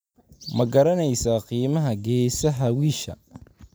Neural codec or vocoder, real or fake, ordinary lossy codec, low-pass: vocoder, 44.1 kHz, 128 mel bands every 256 samples, BigVGAN v2; fake; none; none